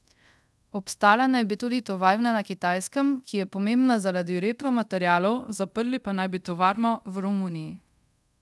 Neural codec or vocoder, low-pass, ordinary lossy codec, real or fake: codec, 24 kHz, 0.5 kbps, DualCodec; none; none; fake